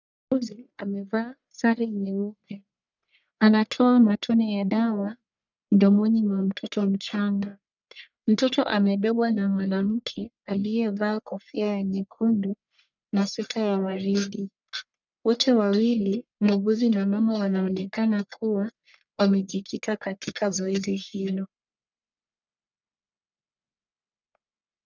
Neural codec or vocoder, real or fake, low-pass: codec, 44.1 kHz, 1.7 kbps, Pupu-Codec; fake; 7.2 kHz